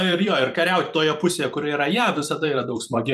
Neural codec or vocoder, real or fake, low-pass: vocoder, 44.1 kHz, 128 mel bands every 256 samples, BigVGAN v2; fake; 14.4 kHz